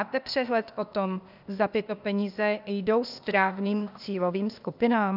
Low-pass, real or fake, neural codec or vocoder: 5.4 kHz; fake; codec, 16 kHz, 0.8 kbps, ZipCodec